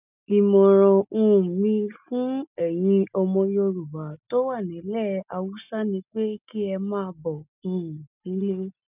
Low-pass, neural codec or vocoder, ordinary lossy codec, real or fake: 3.6 kHz; none; none; real